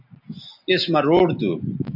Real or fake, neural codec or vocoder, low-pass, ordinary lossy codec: real; none; 5.4 kHz; AAC, 48 kbps